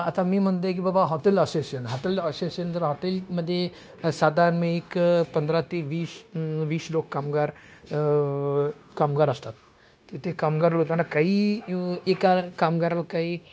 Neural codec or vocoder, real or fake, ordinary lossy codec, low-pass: codec, 16 kHz, 0.9 kbps, LongCat-Audio-Codec; fake; none; none